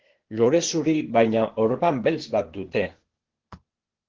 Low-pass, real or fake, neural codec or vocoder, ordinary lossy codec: 7.2 kHz; fake; codec, 16 kHz, 0.8 kbps, ZipCodec; Opus, 16 kbps